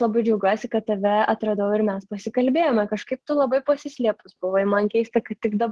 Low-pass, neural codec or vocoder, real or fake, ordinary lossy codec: 10.8 kHz; none; real; Opus, 16 kbps